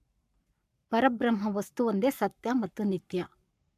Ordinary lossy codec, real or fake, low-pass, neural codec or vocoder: AAC, 96 kbps; fake; 14.4 kHz; codec, 44.1 kHz, 7.8 kbps, Pupu-Codec